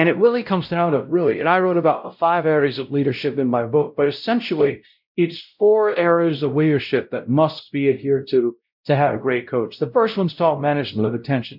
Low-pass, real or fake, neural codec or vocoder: 5.4 kHz; fake; codec, 16 kHz, 0.5 kbps, X-Codec, WavLM features, trained on Multilingual LibriSpeech